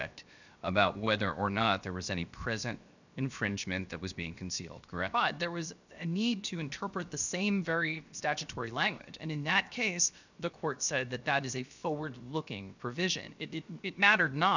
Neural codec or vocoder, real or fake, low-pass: codec, 16 kHz, 0.7 kbps, FocalCodec; fake; 7.2 kHz